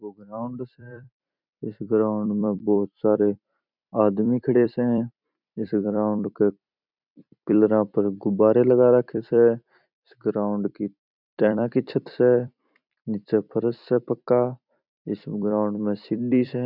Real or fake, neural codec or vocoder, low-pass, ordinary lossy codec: fake; vocoder, 44.1 kHz, 128 mel bands every 256 samples, BigVGAN v2; 5.4 kHz; none